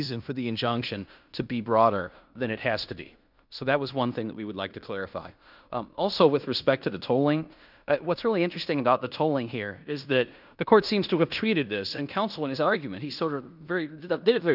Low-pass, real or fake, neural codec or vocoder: 5.4 kHz; fake; codec, 16 kHz in and 24 kHz out, 0.9 kbps, LongCat-Audio-Codec, fine tuned four codebook decoder